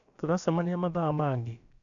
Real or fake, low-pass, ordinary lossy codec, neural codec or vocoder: fake; 7.2 kHz; none; codec, 16 kHz, about 1 kbps, DyCAST, with the encoder's durations